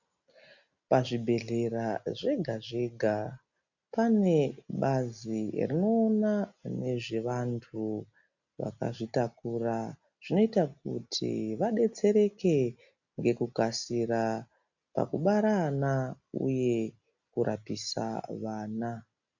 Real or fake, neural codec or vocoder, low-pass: real; none; 7.2 kHz